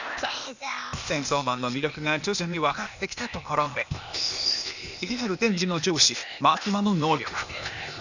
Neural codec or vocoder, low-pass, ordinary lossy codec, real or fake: codec, 16 kHz, 0.8 kbps, ZipCodec; 7.2 kHz; none; fake